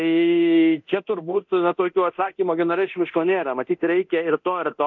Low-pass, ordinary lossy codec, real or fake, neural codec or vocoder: 7.2 kHz; MP3, 64 kbps; fake; codec, 24 kHz, 0.9 kbps, DualCodec